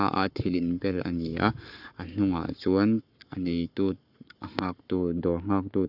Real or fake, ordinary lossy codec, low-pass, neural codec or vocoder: fake; none; 5.4 kHz; codec, 44.1 kHz, 7.8 kbps, Pupu-Codec